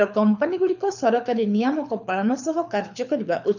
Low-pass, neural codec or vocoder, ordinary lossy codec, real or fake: 7.2 kHz; codec, 24 kHz, 6 kbps, HILCodec; none; fake